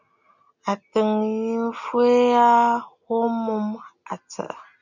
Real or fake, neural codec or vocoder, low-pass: real; none; 7.2 kHz